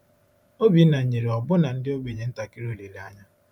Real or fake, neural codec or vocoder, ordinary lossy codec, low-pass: fake; vocoder, 44.1 kHz, 128 mel bands every 512 samples, BigVGAN v2; none; 19.8 kHz